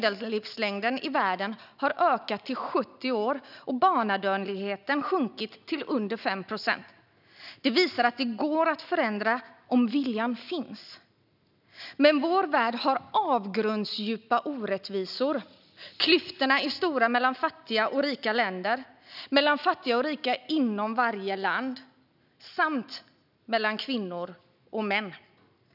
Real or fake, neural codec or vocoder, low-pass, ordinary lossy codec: real; none; 5.4 kHz; none